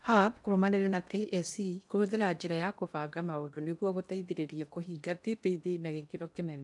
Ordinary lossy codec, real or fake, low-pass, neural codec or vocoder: none; fake; 10.8 kHz; codec, 16 kHz in and 24 kHz out, 0.8 kbps, FocalCodec, streaming, 65536 codes